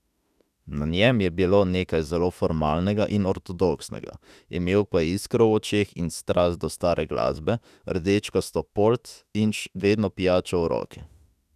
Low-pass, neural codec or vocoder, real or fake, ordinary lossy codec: 14.4 kHz; autoencoder, 48 kHz, 32 numbers a frame, DAC-VAE, trained on Japanese speech; fake; none